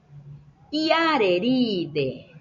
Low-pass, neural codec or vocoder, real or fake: 7.2 kHz; none; real